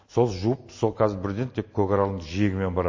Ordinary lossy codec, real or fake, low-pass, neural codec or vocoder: MP3, 32 kbps; real; 7.2 kHz; none